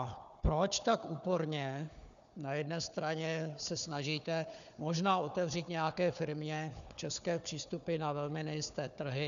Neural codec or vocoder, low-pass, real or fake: codec, 16 kHz, 4 kbps, FunCodec, trained on Chinese and English, 50 frames a second; 7.2 kHz; fake